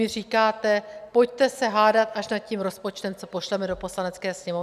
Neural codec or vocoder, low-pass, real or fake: none; 14.4 kHz; real